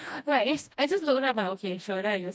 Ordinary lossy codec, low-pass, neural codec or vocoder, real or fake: none; none; codec, 16 kHz, 1 kbps, FreqCodec, smaller model; fake